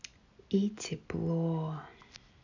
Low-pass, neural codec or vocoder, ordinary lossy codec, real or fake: 7.2 kHz; none; none; real